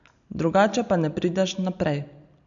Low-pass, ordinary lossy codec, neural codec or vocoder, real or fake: 7.2 kHz; none; none; real